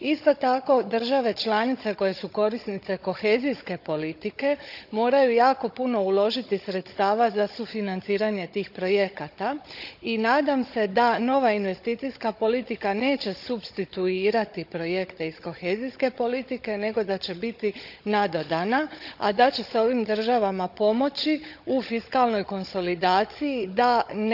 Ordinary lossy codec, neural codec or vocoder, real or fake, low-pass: none; codec, 16 kHz, 16 kbps, FunCodec, trained on Chinese and English, 50 frames a second; fake; 5.4 kHz